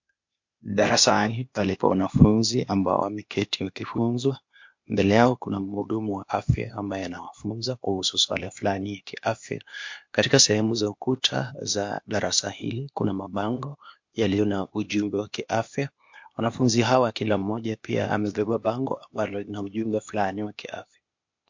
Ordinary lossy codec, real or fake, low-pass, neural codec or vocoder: MP3, 48 kbps; fake; 7.2 kHz; codec, 16 kHz, 0.8 kbps, ZipCodec